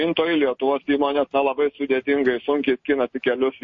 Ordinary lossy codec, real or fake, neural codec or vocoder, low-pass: MP3, 32 kbps; real; none; 7.2 kHz